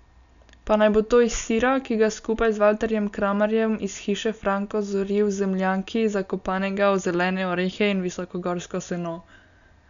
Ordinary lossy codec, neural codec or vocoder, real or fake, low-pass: none; none; real; 7.2 kHz